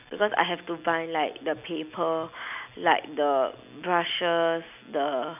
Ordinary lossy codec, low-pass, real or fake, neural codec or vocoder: none; 3.6 kHz; real; none